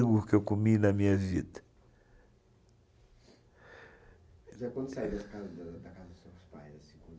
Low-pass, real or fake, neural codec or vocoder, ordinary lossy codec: none; real; none; none